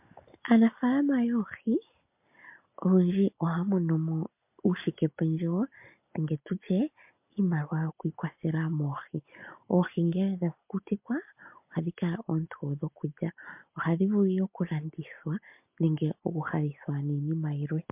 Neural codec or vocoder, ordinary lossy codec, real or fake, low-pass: none; MP3, 32 kbps; real; 3.6 kHz